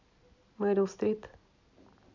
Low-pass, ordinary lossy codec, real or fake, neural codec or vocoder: 7.2 kHz; none; real; none